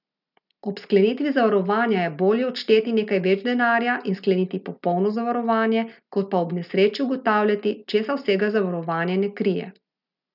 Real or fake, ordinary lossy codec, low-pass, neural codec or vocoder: real; none; 5.4 kHz; none